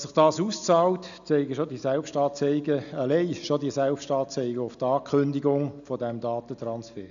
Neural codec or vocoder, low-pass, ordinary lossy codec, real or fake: none; 7.2 kHz; none; real